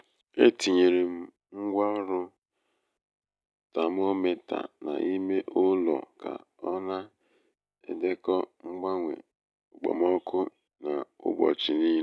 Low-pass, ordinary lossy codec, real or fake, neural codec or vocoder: none; none; real; none